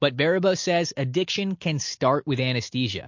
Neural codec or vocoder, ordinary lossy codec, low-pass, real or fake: none; MP3, 48 kbps; 7.2 kHz; real